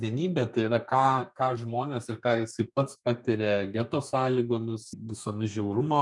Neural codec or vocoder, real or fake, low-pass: codec, 32 kHz, 1.9 kbps, SNAC; fake; 10.8 kHz